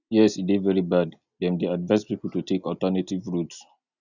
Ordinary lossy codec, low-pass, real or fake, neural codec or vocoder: none; 7.2 kHz; real; none